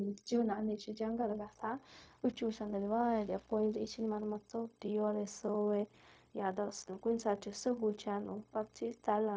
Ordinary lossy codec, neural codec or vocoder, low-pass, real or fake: none; codec, 16 kHz, 0.4 kbps, LongCat-Audio-Codec; none; fake